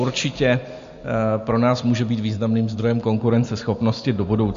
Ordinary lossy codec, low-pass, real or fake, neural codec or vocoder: MP3, 48 kbps; 7.2 kHz; real; none